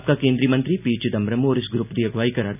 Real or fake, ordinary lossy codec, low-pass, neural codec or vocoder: real; none; 3.6 kHz; none